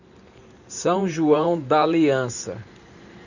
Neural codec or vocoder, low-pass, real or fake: vocoder, 44.1 kHz, 128 mel bands every 512 samples, BigVGAN v2; 7.2 kHz; fake